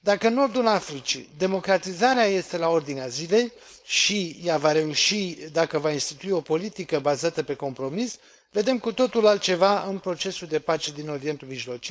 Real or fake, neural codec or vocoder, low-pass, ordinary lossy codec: fake; codec, 16 kHz, 4.8 kbps, FACodec; none; none